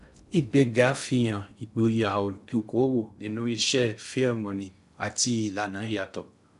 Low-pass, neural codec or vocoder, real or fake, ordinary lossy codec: 10.8 kHz; codec, 16 kHz in and 24 kHz out, 0.6 kbps, FocalCodec, streaming, 2048 codes; fake; none